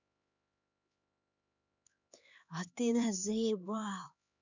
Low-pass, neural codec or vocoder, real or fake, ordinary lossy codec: 7.2 kHz; codec, 16 kHz, 4 kbps, X-Codec, HuBERT features, trained on LibriSpeech; fake; none